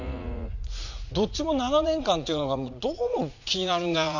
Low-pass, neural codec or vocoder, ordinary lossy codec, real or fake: 7.2 kHz; vocoder, 44.1 kHz, 80 mel bands, Vocos; none; fake